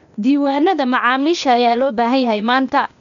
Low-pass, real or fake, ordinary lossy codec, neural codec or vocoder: 7.2 kHz; fake; none; codec, 16 kHz, 0.8 kbps, ZipCodec